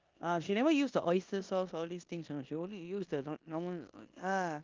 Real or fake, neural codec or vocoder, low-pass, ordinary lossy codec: fake; codec, 16 kHz in and 24 kHz out, 0.9 kbps, LongCat-Audio-Codec, four codebook decoder; 7.2 kHz; Opus, 24 kbps